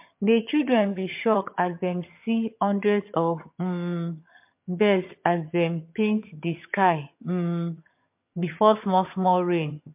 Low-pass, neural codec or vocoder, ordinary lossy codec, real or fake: 3.6 kHz; vocoder, 22.05 kHz, 80 mel bands, HiFi-GAN; MP3, 32 kbps; fake